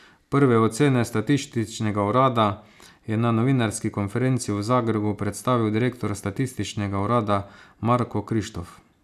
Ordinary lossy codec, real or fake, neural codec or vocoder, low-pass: none; real; none; 14.4 kHz